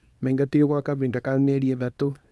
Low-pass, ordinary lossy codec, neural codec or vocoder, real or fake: none; none; codec, 24 kHz, 0.9 kbps, WavTokenizer, small release; fake